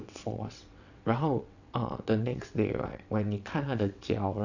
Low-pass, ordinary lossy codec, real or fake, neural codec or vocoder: 7.2 kHz; none; fake; vocoder, 22.05 kHz, 80 mel bands, WaveNeXt